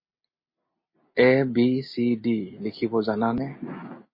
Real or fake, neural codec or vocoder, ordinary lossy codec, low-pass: real; none; MP3, 24 kbps; 5.4 kHz